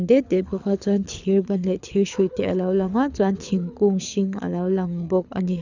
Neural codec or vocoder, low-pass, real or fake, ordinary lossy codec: codec, 24 kHz, 6 kbps, HILCodec; 7.2 kHz; fake; none